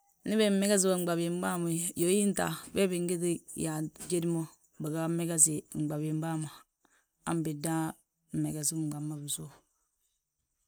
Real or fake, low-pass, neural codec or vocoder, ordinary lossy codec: real; none; none; none